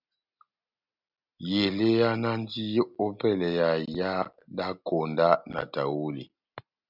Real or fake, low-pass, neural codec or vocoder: real; 5.4 kHz; none